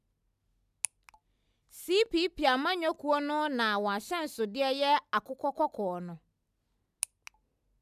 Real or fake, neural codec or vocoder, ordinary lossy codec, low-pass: real; none; none; 14.4 kHz